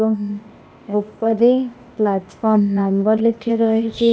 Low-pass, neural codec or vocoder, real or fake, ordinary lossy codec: none; codec, 16 kHz, 0.8 kbps, ZipCodec; fake; none